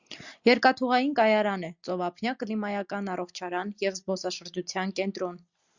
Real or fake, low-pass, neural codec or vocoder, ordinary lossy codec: real; 7.2 kHz; none; Opus, 64 kbps